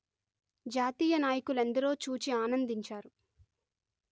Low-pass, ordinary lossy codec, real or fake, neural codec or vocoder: none; none; real; none